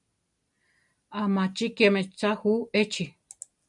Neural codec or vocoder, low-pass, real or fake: none; 10.8 kHz; real